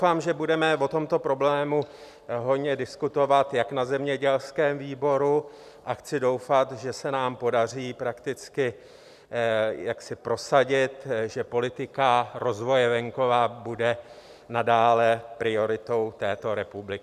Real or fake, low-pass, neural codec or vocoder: real; 14.4 kHz; none